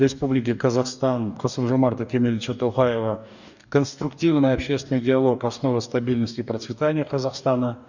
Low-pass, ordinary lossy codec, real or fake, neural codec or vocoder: 7.2 kHz; none; fake; codec, 44.1 kHz, 2.6 kbps, DAC